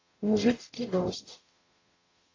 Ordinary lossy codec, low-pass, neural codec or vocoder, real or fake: AAC, 32 kbps; 7.2 kHz; codec, 44.1 kHz, 0.9 kbps, DAC; fake